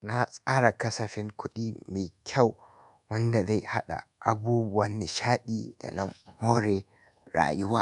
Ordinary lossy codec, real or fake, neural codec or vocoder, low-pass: none; fake; codec, 24 kHz, 1.2 kbps, DualCodec; 10.8 kHz